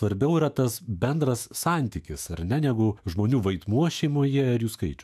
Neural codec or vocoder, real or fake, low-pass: autoencoder, 48 kHz, 128 numbers a frame, DAC-VAE, trained on Japanese speech; fake; 14.4 kHz